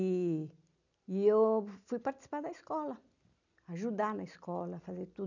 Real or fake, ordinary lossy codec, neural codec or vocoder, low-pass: real; none; none; 7.2 kHz